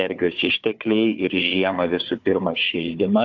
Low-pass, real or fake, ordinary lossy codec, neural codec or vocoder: 7.2 kHz; fake; AAC, 48 kbps; codec, 16 kHz, 4 kbps, FunCodec, trained on Chinese and English, 50 frames a second